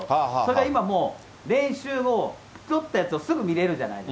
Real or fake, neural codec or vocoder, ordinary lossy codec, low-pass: real; none; none; none